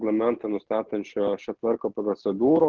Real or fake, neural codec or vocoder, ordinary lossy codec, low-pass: real; none; Opus, 16 kbps; 7.2 kHz